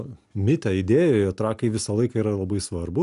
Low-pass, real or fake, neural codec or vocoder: 10.8 kHz; real; none